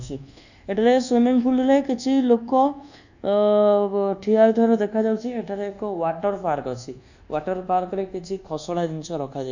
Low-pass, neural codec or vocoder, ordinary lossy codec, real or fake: 7.2 kHz; codec, 24 kHz, 1.2 kbps, DualCodec; none; fake